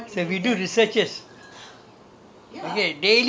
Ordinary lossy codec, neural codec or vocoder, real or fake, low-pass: none; none; real; none